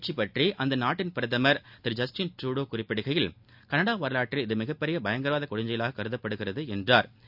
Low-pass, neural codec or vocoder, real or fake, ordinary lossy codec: 5.4 kHz; none; real; none